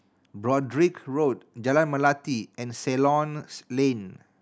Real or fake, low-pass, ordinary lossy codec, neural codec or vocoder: real; none; none; none